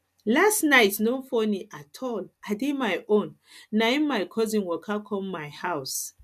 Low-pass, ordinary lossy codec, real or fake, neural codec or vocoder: 14.4 kHz; none; real; none